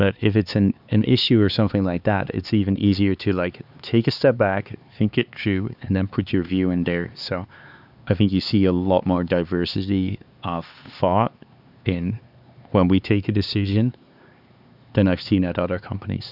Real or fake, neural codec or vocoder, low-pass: fake; codec, 16 kHz, 4 kbps, X-Codec, HuBERT features, trained on LibriSpeech; 5.4 kHz